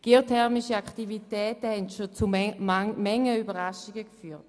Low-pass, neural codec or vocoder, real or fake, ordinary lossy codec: none; none; real; none